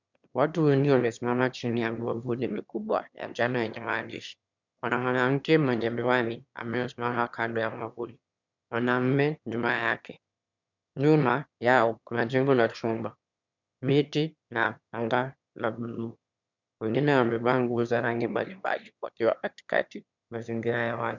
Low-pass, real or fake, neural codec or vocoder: 7.2 kHz; fake; autoencoder, 22.05 kHz, a latent of 192 numbers a frame, VITS, trained on one speaker